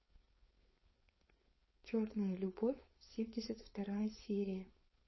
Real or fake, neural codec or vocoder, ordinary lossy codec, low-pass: fake; codec, 16 kHz, 4.8 kbps, FACodec; MP3, 24 kbps; 7.2 kHz